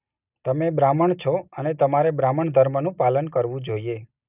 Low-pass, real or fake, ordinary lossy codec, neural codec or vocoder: 3.6 kHz; real; none; none